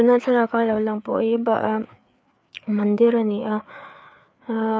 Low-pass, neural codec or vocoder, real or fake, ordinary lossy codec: none; codec, 16 kHz, 4 kbps, FreqCodec, larger model; fake; none